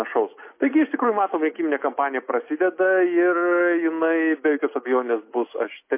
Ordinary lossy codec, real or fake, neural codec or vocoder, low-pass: MP3, 24 kbps; real; none; 3.6 kHz